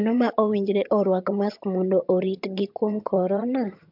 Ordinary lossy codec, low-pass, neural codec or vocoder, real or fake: MP3, 48 kbps; 5.4 kHz; vocoder, 22.05 kHz, 80 mel bands, HiFi-GAN; fake